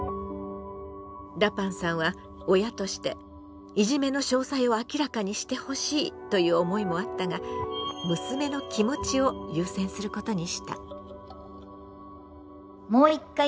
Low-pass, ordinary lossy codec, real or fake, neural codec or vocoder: none; none; real; none